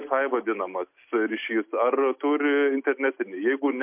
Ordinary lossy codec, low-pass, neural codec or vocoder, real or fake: MP3, 32 kbps; 3.6 kHz; none; real